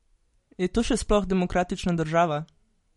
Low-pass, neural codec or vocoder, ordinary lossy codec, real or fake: 19.8 kHz; none; MP3, 48 kbps; real